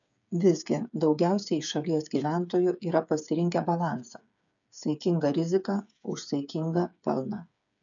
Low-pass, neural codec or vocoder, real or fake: 7.2 kHz; codec, 16 kHz, 8 kbps, FreqCodec, smaller model; fake